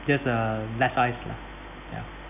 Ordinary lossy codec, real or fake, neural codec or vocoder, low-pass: none; real; none; 3.6 kHz